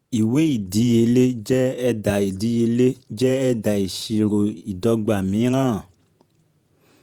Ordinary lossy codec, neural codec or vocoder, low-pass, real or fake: Opus, 64 kbps; vocoder, 48 kHz, 128 mel bands, Vocos; 19.8 kHz; fake